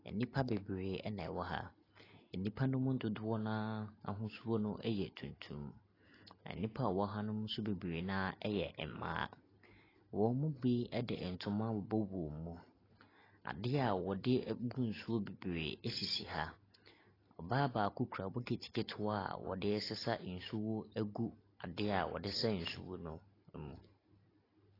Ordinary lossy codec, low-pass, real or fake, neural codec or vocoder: AAC, 24 kbps; 5.4 kHz; real; none